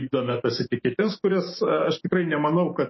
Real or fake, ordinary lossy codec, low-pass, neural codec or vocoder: fake; MP3, 24 kbps; 7.2 kHz; autoencoder, 48 kHz, 128 numbers a frame, DAC-VAE, trained on Japanese speech